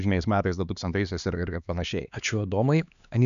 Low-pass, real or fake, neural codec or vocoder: 7.2 kHz; fake; codec, 16 kHz, 2 kbps, X-Codec, HuBERT features, trained on LibriSpeech